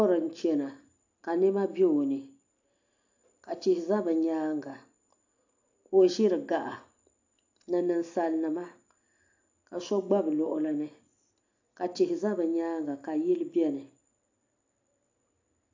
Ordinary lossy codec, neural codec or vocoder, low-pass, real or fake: AAC, 48 kbps; none; 7.2 kHz; real